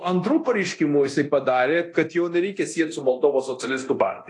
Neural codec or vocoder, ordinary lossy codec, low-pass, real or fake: codec, 24 kHz, 0.9 kbps, DualCodec; AAC, 48 kbps; 10.8 kHz; fake